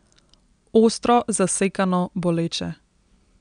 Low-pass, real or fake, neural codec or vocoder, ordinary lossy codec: 9.9 kHz; real; none; none